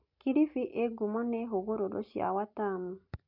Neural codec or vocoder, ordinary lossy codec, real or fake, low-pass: none; MP3, 48 kbps; real; 5.4 kHz